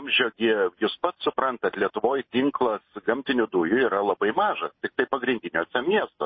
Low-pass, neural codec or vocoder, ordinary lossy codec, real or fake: 7.2 kHz; none; MP3, 24 kbps; real